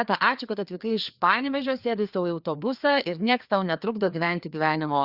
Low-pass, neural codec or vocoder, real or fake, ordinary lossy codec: 5.4 kHz; codec, 16 kHz, 2 kbps, FreqCodec, larger model; fake; Opus, 64 kbps